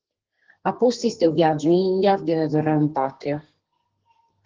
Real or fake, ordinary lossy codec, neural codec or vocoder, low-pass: fake; Opus, 16 kbps; codec, 32 kHz, 1.9 kbps, SNAC; 7.2 kHz